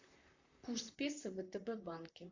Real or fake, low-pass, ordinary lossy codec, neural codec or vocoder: fake; 7.2 kHz; AAC, 48 kbps; vocoder, 44.1 kHz, 128 mel bands, Pupu-Vocoder